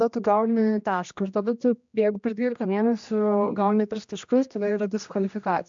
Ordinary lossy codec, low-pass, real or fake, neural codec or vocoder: MP3, 64 kbps; 7.2 kHz; fake; codec, 16 kHz, 1 kbps, X-Codec, HuBERT features, trained on general audio